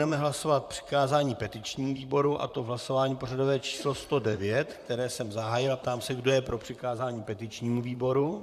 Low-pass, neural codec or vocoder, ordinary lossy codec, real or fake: 14.4 kHz; vocoder, 44.1 kHz, 128 mel bands, Pupu-Vocoder; MP3, 96 kbps; fake